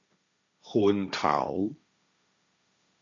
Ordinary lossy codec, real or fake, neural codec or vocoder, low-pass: MP3, 64 kbps; fake; codec, 16 kHz, 1.1 kbps, Voila-Tokenizer; 7.2 kHz